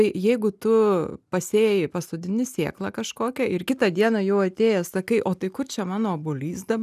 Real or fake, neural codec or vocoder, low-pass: real; none; 14.4 kHz